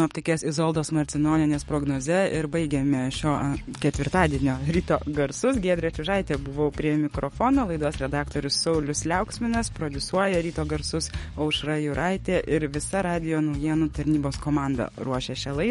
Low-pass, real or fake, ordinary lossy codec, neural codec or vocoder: 19.8 kHz; fake; MP3, 48 kbps; codec, 44.1 kHz, 7.8 kbps, DAC